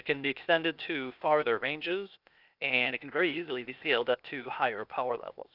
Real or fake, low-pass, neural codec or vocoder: fake; 5.4 kHz; codec, 16 kHz, 0.8 kbps, ZipCodec